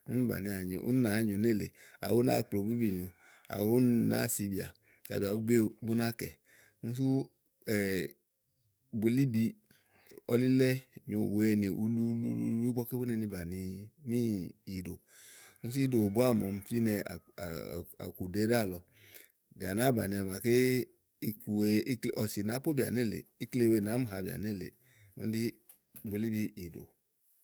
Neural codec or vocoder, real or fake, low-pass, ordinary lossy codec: codec, 44.1 kHz, 7.8 kbps, DAC; fake; none; none